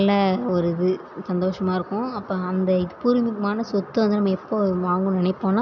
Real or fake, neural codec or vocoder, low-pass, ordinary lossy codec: real; none; 7.2 kHz; none